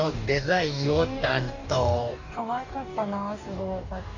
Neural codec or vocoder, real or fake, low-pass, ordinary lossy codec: codec, 44.1 kHz, 2.6 kbps, DAC; fake; 7.2 kHz; none